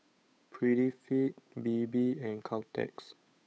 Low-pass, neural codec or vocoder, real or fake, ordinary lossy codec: none; codec, 16 kHz, 8 kbps, FunCodec, trained on Chinese and English, 25 frames a second; fake; none